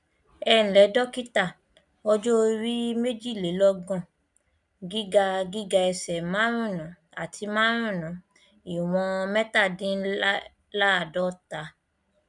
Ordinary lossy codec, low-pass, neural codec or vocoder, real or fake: none; 10.8 kHz; none; real